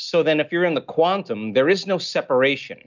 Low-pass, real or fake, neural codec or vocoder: 7.2 kHz; real; none